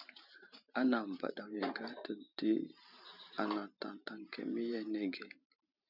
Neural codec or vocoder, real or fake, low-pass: vocoder, 44.1 kHz, 128 mel bands every 512 samples, BigVGAN v2; fake; 5.4 kHz